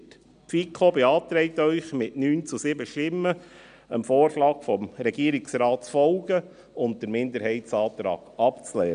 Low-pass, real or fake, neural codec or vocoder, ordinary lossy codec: 9.9 kHz; real; none; none